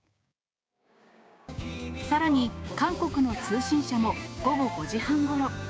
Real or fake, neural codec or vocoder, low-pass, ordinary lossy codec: fake; codec, 16 kHz, 6 kbps, DAC; none; none